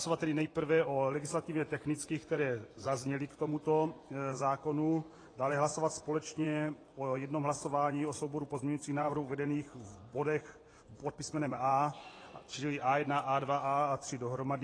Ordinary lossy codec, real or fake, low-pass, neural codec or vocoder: AAC, 32 kbps; fake; 9.9 kHz; vocoder, 24 kHz, 100 mel bands, Vocos